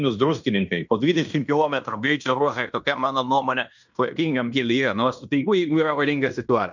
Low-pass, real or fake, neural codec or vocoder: 7.2 kHz; fake; codec, 16 kHz in and 24 kHz out, 0.9 kbps, LongCat-Audio-Codec, fine tuned four codebook decoder